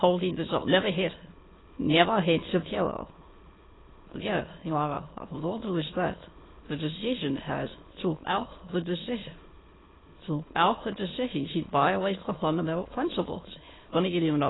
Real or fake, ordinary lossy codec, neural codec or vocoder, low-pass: fake; AAC, 16 kbps; autoencoder, 22.05 kHz, a latent of 192 numbers a frame, VITS, trained on many speakers; 7.2 kHz